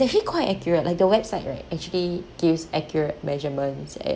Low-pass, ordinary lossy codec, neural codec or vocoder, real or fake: none; none; none; real